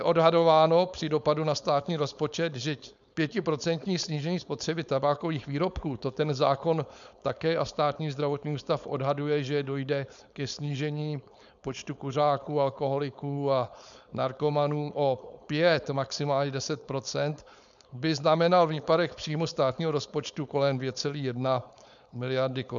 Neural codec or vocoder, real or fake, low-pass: codec, 16 kHz, 4.8 kbps, FACodec; fake; 7.2 kHz